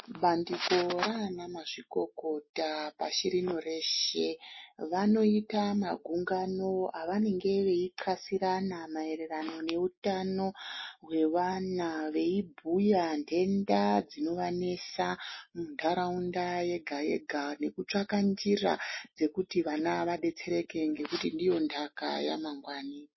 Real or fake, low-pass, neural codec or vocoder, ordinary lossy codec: real; 7.2 kHz; none; MP3, 24 kbps